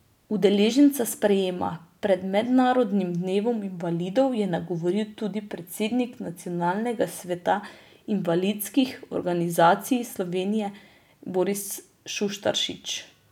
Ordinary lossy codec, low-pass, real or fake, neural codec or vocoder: none; 19.8 kHz; real; none